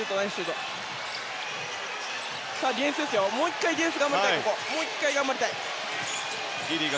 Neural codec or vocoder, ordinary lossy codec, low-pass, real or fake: none; none; none; real